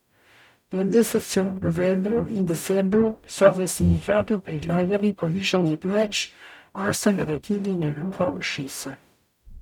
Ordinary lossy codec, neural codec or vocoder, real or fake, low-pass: none; codec, 44.1 kHz, 0.9 kbps, DAC; fake; 19.8 kHz